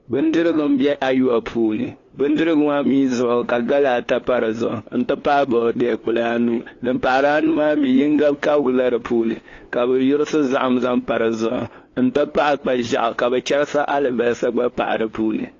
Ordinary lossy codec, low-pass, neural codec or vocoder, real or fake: AAC, 32 kbps; 7.2 kHz; codec, 16 kHz, 2 kbps, FunCodec, trained on LibriTTS, 25 frames a second; fake